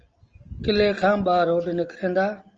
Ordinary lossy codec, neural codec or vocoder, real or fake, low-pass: Opus, 24 kbps; none; real; 7.2 kHz